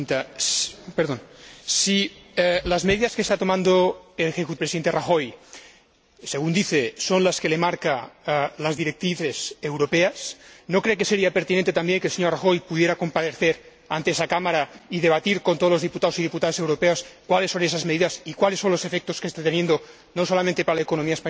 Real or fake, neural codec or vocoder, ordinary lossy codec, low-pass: real; none; none; none